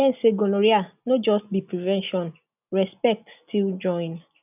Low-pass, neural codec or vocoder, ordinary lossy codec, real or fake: 3.6 kHz; none; none; real